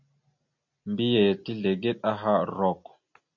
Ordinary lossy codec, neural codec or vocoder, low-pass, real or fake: MP3, 48 kbps; none; 7.2 kHz; real